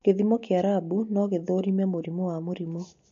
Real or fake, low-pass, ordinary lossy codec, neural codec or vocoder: real; 7.2 kHz; MP3, 48 kbps; none